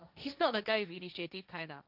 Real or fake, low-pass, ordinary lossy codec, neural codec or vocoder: fake; 5.4 kHz; none; codec, 16 kHz, 1.1 kbps, Voila-Tokenizer